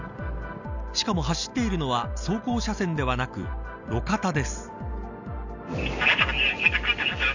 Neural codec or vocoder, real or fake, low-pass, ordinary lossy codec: none; real; 7.2 kHz; none